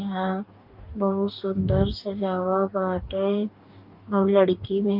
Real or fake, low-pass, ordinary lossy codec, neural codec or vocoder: fake; 5.4 kHz; Opus, 32 kbps; codec, 44.1 kHz, 2.6 kbps, DAC